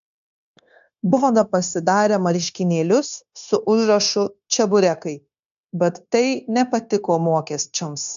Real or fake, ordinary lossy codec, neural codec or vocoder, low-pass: fake; AAC, 96 kbps; codec, 16 kHz, 0.9 kbps, LongCat-Audio-Codec; 7.2 kHz